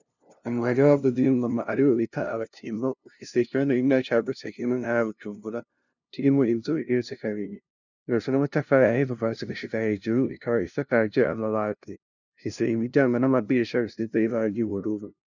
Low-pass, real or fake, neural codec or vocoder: 7.2 kHz; fake; codec, 16 kHz, 0.5 kbps, FunCodec, trained on LibriTTS, 25 frames a second